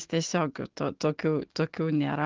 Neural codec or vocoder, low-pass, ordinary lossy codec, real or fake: autoencoder, 48 kHz, 128 numbers a frame, DAC-VAE, trained on Japanese speech; 7.2 kHz; Opus, 16 kbps; fake